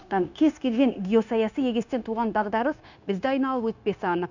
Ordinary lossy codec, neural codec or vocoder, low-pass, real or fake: none; codec, 16 kHz, 0.9 kbps, LongCat-Audio-Codec; 7.2 kHz; fake